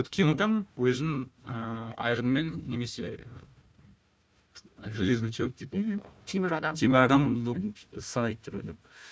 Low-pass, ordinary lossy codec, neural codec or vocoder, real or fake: none; none; codec, 16 kHz, 1 kbps, FunCodec, trained on Chinese and English, 50 frames a second; fake